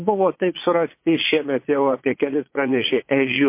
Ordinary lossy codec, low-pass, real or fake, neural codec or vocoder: MP3, 24 kbps; 3.6 kHz; fake; vocoder, 22.05 kHz, 80 mel bands, Vocos